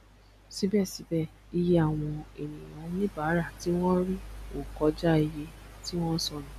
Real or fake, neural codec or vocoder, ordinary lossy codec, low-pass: real; none; none; 14.4 kHz